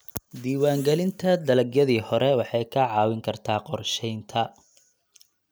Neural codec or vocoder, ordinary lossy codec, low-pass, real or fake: none; none; none; real